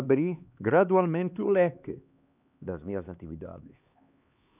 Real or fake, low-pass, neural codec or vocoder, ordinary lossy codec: fake; 3.6 kHz; codec, 16 kHz, 2 kbps, X-Codec, HuBERT features, trained on LibriSpeech; none